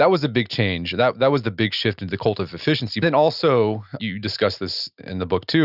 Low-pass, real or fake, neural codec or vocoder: 5.4 kHz; real; none